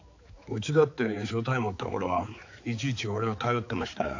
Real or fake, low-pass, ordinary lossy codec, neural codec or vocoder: fake; 7.2 kHz; AAC, 48 kbps; codec, 16 kHz, 4 kbps, X-Codec, HuBERT features, trained on balanced general audio